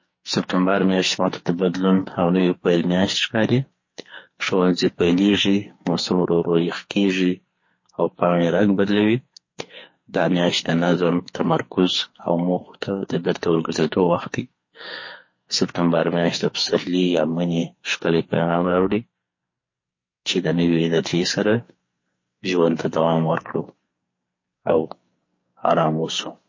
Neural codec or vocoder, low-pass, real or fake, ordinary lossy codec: codec, 44.1 kHz, 2.6 kbps, SNAC; 7.2 kHz; fake; MP3, 32 kbps